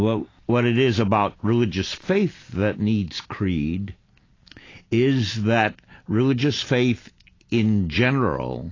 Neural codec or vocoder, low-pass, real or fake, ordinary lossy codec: none; 7.2 kHz; real; AAC, 32 kbps